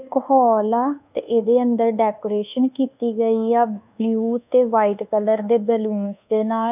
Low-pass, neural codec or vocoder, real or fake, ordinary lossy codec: 3.6 kHz; codec, 24 kHz, 1.2 kbps, DualCodec; fake; none